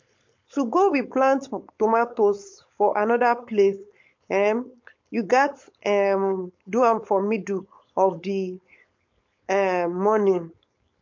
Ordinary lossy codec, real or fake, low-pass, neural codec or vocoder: MP3, 48 kbps; fake; 7.2 kHz; codec, 16 kHz, 4.8 kbps, FACodec